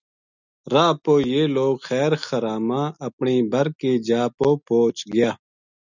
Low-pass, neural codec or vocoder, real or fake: 7.2 kHz; none; real